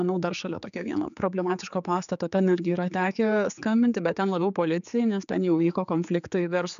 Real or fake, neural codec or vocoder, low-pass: fake; codec, 16 kHz, 4 kbps, X-Codec, HuBERT features, trained on general audio; 7.2 kHz